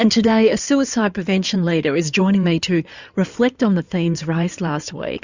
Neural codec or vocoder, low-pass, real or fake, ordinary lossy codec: codec, 16 kHz in and 24 kHz out, 2.2 kbps, FireRedTTS-2 codec; 7.2 kHz; fake; Opus, 64 kbps